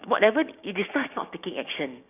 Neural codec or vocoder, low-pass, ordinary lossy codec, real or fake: codec, 16 kHz, 6 kbps, DAC; 3.6 kHz; none; fake